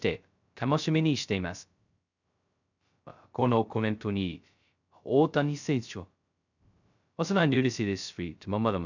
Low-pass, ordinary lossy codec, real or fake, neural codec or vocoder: 7.2 kHz; none; fake; codec, 16 kHz, 0.2 kbps, FocalCodec